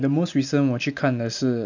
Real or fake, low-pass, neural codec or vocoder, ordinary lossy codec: real; 7.2 kHz; none; none